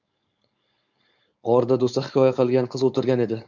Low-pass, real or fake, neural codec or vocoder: 7.2 kHz; fake; codec, 16 kHz, 4.8 kbps, FACodec